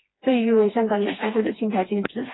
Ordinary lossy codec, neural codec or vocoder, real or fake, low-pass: AAC, 16 kbps; codec, 16 kHz, 2 kbps, FreqCodec, smaller model; fake; 7.2 kHz